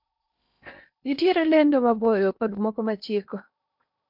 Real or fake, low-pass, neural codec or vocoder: fake; 5.4 kHz; codec, 16 kHz in and 24 kHz out, 0.8 kbps, FocalCodec, streaming, 65536 codes